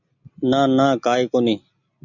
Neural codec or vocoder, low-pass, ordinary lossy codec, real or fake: none; 7.2 kHz; MP3, 64 kbps; real